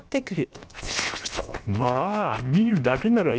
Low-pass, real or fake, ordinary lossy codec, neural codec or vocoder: none; fake; none; codec, 16 kHz, 0.7 kbps, FocalCodec